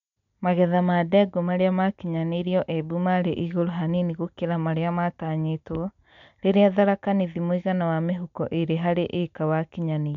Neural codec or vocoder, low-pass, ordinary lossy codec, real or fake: none; 7.2 kHz; none; real